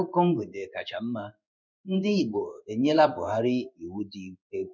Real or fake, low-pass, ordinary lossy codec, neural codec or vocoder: fake; 7.2 kHz; none; codec, 16 kHz in and 24 kHz out, 1 kbps, XY-Tokenizer